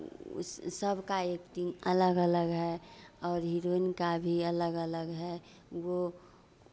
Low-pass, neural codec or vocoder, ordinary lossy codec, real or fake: none; none; none; real